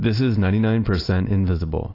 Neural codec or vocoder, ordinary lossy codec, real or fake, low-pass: none; AAC, 32 kbps; real; 5.4 kHz